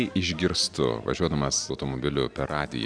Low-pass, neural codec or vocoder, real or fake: 9.9 kHz; none; real